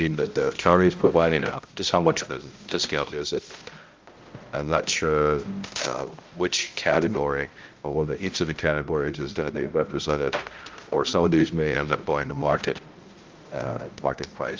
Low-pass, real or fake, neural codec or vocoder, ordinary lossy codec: 7.2 kHz; fake; codec, 16 kHz, 0.5 kbps, X-Codec, HuBERT features, trained on balanced general audio; Opus, 32 kbps